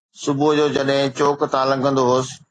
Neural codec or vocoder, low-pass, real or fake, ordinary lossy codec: none; 9.9 kHz; real; AAC, 32 kbps